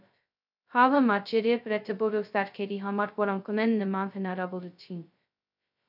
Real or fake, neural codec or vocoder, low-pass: fake; codec, 16 kHz, 0.2 kbps, FocalCodec; 5.4 kHz